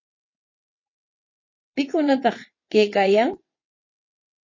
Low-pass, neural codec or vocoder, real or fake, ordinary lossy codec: 7.2 kHz; vocoder, 22.05 kHz, 80 mel bands, WaveNeXt; fake; MP3, 32 kbps